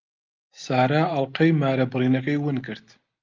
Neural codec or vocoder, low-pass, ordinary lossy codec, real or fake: none; 7.2 kHz; Opus, 32 kbps; real